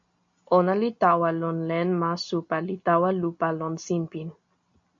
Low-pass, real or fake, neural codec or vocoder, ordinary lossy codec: 7.2 kHz; real; none; MP3, 48 kbps